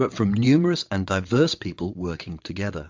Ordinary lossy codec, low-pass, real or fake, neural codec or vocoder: MP3, 64 kbps; 7.2 kHz; fake; vocoder, 22.05 kHz, 80 mel bands, WaveNeXt